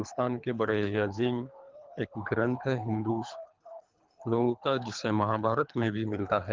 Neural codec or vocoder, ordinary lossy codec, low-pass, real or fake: codec, 24 kHz, 3 kbps, HILCodec; Opus, 32 kbps; 7.2 kHz; fake